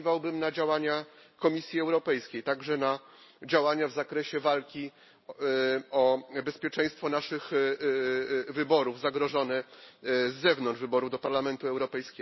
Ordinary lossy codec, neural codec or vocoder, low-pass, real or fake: MP3, 24 kbps; none; 7.2 kHz; real